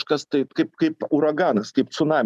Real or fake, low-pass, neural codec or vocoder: real; 14.4 kHz; none